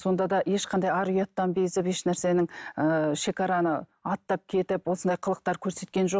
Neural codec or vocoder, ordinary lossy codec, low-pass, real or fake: none; none; none; real